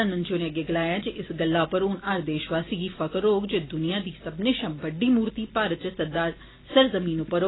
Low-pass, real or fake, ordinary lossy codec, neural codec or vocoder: 7.2 kHz; real; AAC, 16 kbps; none